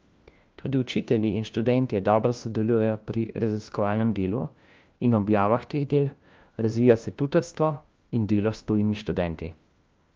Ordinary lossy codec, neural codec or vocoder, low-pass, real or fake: Opus, 24 kbps; codec, 16 kHz, 1 kbps, FunCodec, trained on LibriTTS, 50 frames a second; 7.2 kHz; fake